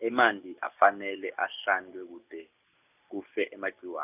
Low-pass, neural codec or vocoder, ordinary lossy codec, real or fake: 3.6 kHz; none; none; real